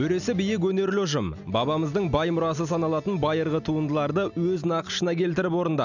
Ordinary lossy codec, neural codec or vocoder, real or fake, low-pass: none; none; real; 7.2 kHz